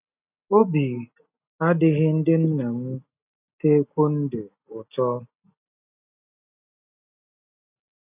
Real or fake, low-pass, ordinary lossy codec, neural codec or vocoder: real; 3.6 kHz; none; none